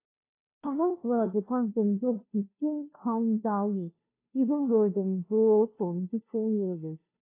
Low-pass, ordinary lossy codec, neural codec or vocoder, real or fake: 3.6 kHz; AAC, 24 kbps; codec, 16 kHz, 0.5 kbps, FunCodec, trained on Chinese and English, 25 frames a second; fake